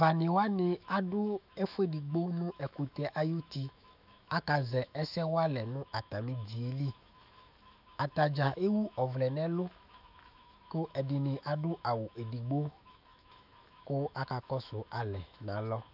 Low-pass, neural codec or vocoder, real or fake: 5.4 kHz; autoencoder, 48 kHz, 128 numbers a frame, DAC-VAE, trained on Japanese speech; fake